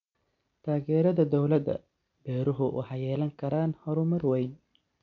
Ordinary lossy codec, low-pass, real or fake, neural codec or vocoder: none; 7.2 kHz; real; none